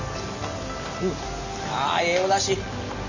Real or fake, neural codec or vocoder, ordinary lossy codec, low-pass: real; none; none; 7.2 kHz